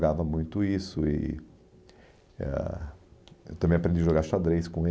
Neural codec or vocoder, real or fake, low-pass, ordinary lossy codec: none; real; none; none